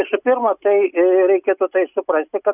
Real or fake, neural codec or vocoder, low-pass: real; none; 3.6 kHz